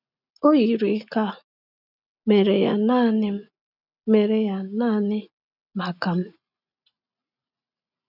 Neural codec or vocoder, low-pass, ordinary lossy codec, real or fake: none; 5.4 kHz; none; real